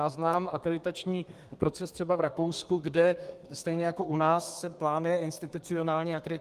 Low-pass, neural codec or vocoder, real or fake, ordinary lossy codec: 14.4 kHz; codec, 32 kHz, 1.9 kbps, SNAC; fake; Opus, 32 kbps